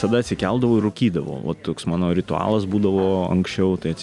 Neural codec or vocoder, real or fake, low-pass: none; real; 10.8 kHz